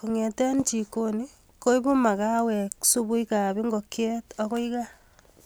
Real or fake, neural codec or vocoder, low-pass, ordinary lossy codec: real; none; none; none